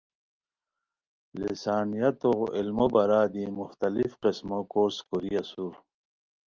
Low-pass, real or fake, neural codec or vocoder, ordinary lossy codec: 7.2 kHz; real; none; Opus, 24 kbps